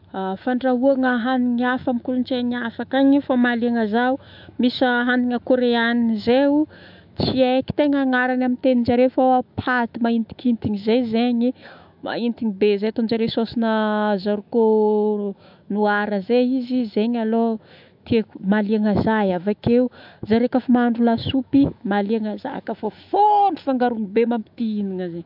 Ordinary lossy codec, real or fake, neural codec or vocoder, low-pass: AAC, 48 kbps; fake; autoencoder, 48 kHz, 128 numbers a frame, DAC-VAE, trained on Japanese speech; 5.4 kHz